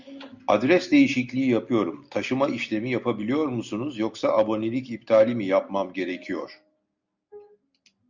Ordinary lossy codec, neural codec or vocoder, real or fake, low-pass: Opus, 64 kbps; none; real; 7.2 kHz